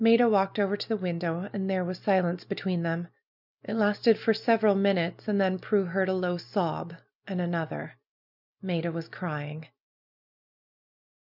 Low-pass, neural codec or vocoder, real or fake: 5.4 kHz; none; real